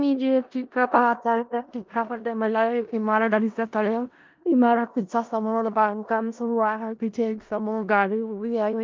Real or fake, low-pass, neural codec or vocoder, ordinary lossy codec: fake; 7.2 kHz; codec, 16 kHz in and 24 kHz out, 0.4 kbps, LongCat-Audio-Codec, four codebook decoder; Opus, 24 kbps